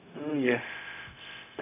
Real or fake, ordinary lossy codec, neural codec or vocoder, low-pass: fake; none; codec, 16 kHz, 0.4 kbps, LongCat-Audio-Codec; 3.6 kHz